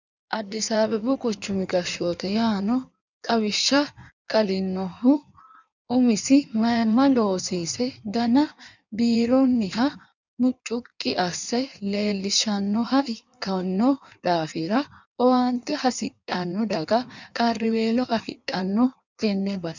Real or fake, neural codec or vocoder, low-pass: fake; codec, 16 kHz in and 24 kHz out, 1.1 kbps, FireRedTTS-2 codec; 7.2 kHz